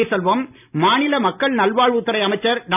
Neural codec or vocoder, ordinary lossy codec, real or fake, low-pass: none; none; real; 3.6 kHz